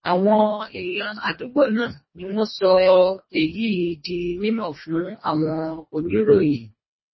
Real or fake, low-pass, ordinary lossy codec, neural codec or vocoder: fake; 7.2 kHz; MP3, 24 kbps; codec, 24 kHz, 1.5 kbps, HILCodec